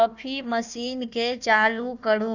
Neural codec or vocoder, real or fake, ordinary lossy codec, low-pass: codec, 16 kHz, 1 kbps, FunCodec, trained on Chinese and English, 50 frames a second; fake; none; 7.2 kHz